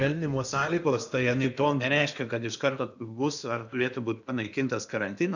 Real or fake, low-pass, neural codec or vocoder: fake; 7.2 kHz; codec, 16 kHz in and 24 kHz out, 0.8 kbps, FocalCodec, streaming, 65536 codes